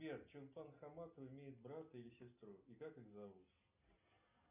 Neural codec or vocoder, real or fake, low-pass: none; real; 3.6 kHz